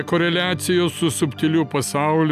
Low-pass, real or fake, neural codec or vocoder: 14.4 kHz; real; none